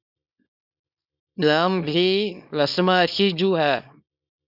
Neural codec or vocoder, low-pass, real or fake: codec, 24 kHz, 0.9 kbps, WavTokenizer, small release; 5.4 kHz; fake